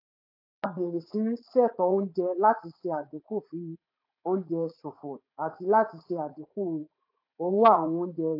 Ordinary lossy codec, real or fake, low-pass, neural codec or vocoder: AAC, 32 kbps; fake; 5.4 kHz; vocoder, 44.1 kHz, 80 mel bands, Vocos